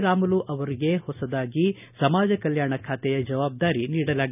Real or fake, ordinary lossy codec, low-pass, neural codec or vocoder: real; none; 3.6 kHz; none